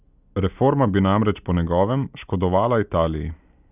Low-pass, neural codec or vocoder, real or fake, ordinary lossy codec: 3.6 kHz; none; real; none